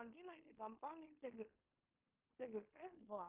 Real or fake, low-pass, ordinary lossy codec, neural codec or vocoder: fake; 3.6 kHz; Opus, 24 kbps; codec, 16 kHz in and 24 kHz out, 0.9 kbps, LongCat-Audio-Codec, fine tuned four codebook decoder